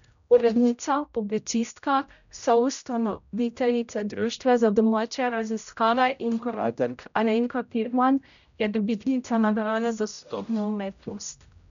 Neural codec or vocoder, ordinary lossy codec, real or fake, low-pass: codec, 16 kHz, 0.5 kbps, X-Codec, HuBERT features, trained on general audio; none; fake; 7.2 kHz